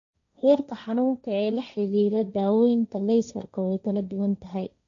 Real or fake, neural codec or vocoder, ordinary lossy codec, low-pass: fake; codec, 16 kHz, 1.1 kbps, Voila-Tokenizer; none; 7.2 kHz